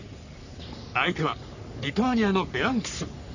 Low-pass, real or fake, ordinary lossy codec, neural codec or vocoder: 7.2 kHz; fake; none; codec, 44.1 kHz, 3.4 kbps, Pupu-Codec